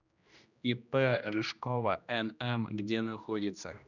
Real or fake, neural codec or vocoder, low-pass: fake; codec, 16 kHz, 1 kbps, X-Codec, HuBERT features, trained on general audio; 7.2 kHz